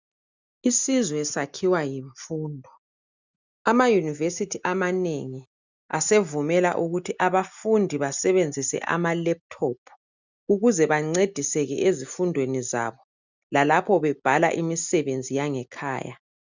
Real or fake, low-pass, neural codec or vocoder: real; 7.2 kHz; none